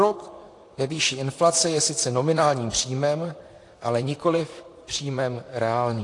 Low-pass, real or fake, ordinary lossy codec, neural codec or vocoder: 10.8 kHz; fake; AAC, 48 kbps; vocoder, 44.1 kHz, 128 mel bands, Pupu-Vocoder